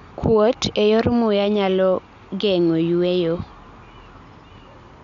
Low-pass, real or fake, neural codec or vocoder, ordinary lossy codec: 7.2 kHz; real; none; none